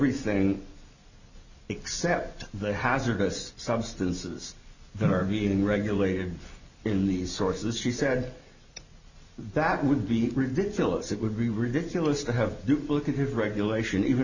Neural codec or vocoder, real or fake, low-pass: none; real; 7.2 kHz